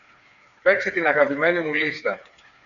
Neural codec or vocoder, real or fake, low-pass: codec, 16 kHz, 4 kbps, FreqCodec, smaller model; fake; 7.2 kHz